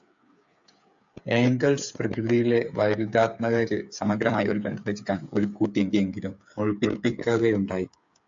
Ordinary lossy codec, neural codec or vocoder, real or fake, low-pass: AAC, 64 kbps; codec, 16 kHz, 8 kbps, FreqCodec, smaller model; fake; 7.2 kHz